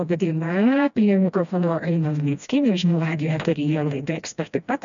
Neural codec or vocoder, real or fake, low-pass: codec, 16 kHz, 1 kbps, FreqCodec, smaller model; fake; 7.2 kHz